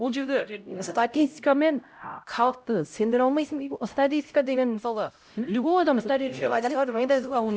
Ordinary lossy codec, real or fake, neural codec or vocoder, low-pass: none; fake; codec, 16 kHz, 0.5 kbps, X-Codec, HuBERT features, trained on LibriSpeech; none